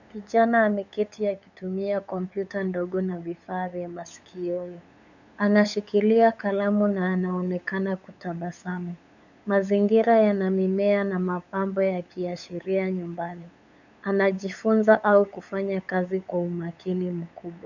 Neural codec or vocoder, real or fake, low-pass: codec, 16 kHz, 8 kbps, FunCodec, trained on LibriTTS, 25 frames a second; fake; 7.2 kHz